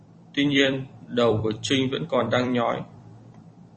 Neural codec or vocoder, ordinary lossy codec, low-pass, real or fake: vocoder, 44.1 kHz, 128 mel bands every 256 samples, BigVGAN v2; MP3, 32 kbps; 10.8 kHz; fake